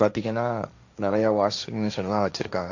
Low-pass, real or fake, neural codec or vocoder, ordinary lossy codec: 7.2 kHz; fake; codec, 16 kHz, 1.1 kbps, Voila-Tokenizer; none